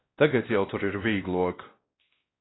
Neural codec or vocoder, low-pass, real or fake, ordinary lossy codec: codec, 16 kHz, 1 kbps, X-Codec, WavLM features, trained on Multilingual LibriSpeech; 7.2 kHz; fake; AAC, 16 kbps